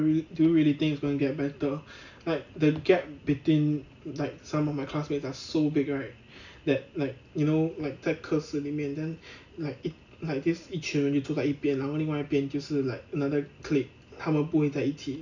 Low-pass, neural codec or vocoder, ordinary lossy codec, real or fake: 7.2 kHz; none; none; real